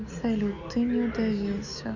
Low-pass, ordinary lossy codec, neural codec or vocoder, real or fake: 7.2 kHz; none; none; real